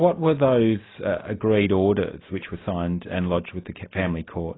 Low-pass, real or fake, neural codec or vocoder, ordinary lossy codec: 7.2 kHz; real; none; AAC, 16 kbps